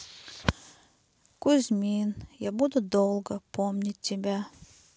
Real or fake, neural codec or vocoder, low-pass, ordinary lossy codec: real; none; none; none